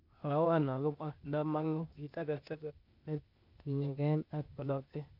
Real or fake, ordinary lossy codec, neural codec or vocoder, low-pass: fake; none; codec, 16 kHz, 0.8 kbps, ZipCodec; 5.4 kHz